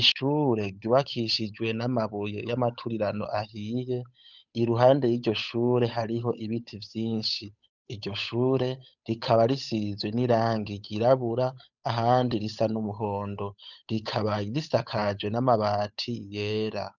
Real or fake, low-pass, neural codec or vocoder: fake; 7.2 kHz; codec, 16 kHz, 8 kbps, FunCodec, trained on Chinese and English, 25 frames a second